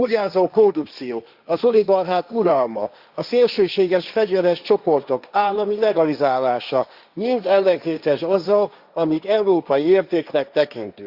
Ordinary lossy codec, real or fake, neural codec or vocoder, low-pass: Opus, 64 kbps; fake; codec, 16 kHz, 1.1 kbps, Voila-Tokenizer; 5.4 kHz